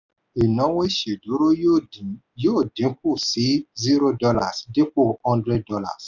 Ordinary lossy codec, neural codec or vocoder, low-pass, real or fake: none; none; 7.2 kHz; real